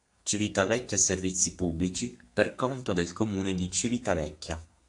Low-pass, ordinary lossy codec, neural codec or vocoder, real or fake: 10.8 kHz; AAC, 64 kbps; codec, 32 kHz, 1.9 kbps, SNAC; fake